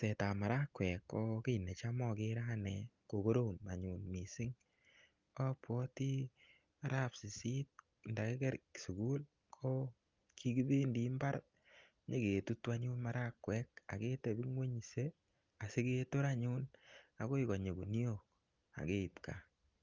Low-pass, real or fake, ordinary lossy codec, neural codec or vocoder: 7.2 kHz; real; Opus, 32 kbps; none